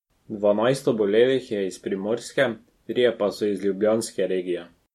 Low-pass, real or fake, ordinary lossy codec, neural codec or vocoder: 19.8 kHz; real; MP3, 64 kbps; none